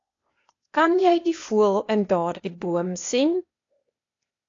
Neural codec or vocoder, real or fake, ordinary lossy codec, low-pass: codec, 16 kHz, 0.8 kbps, ZipCodec; fake; AAC, 48 kbps; 7.2 kHz